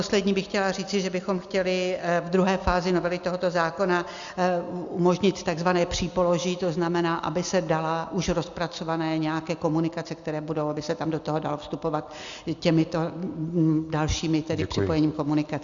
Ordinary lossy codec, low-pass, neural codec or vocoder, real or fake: Opus, 64 kbps; 7.2 kHz; none; real